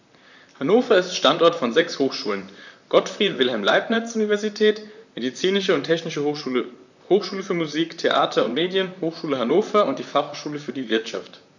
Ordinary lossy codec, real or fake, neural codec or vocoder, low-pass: none; fake; vocoder, 44.1 kHz, 128 mel bands, Pupu-Vocoder; 7.2 kHz